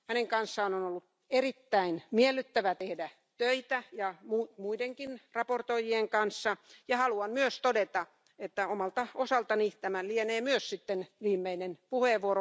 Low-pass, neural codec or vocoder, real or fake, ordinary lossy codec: none; none; real; none